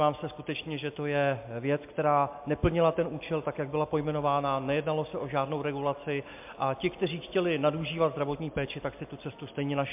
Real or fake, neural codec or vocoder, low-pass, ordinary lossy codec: real; none; 3.6 kHz; MP3, 32 kbps